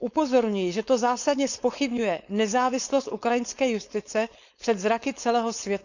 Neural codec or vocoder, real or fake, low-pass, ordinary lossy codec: codec, 16 kHz, 4.8 kbps, FACodec; fake; 7.2 kHz; none